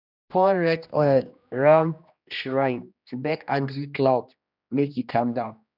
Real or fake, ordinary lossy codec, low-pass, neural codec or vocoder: fake; none; 5.4 kHz; codec, 16 kHz, 1 kbps, X-Codec, HuBERT features, trained on general audio